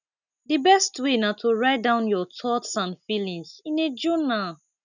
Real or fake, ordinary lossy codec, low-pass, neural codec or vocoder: real; none; 7.2 kHz; none